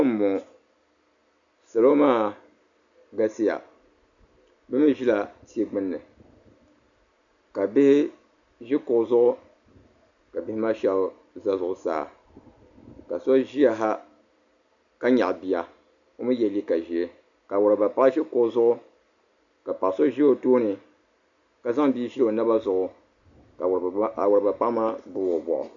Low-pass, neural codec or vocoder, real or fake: 7.2 kHz; none; real